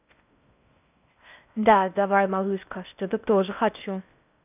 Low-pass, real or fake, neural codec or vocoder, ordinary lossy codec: 3.6 kHz; fake; codec, 16 kHz in and 24 kHz out, 0.6 kbps, FocalCodec, streaming, 4096 codes; none